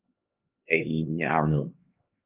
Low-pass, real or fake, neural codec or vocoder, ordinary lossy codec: 3.6 kHz; fake; codec, 16 kHz, 2 kbps, X-Codec, HuBERT features, trained on LibriSpeech; Opus, 24 kbps